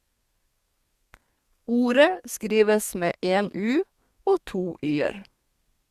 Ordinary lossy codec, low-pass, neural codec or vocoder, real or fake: Opus, 64 kbps; 14.4 kHz; codec, 32 kHz, 1.9 kbps, SNAC; fake